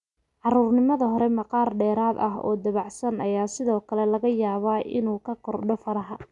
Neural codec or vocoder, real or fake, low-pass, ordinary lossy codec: none; real; 10.8 kHz; none